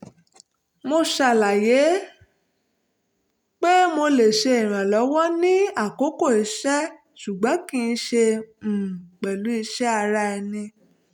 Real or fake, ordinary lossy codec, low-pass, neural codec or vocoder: real; none; 19.8 kHz; none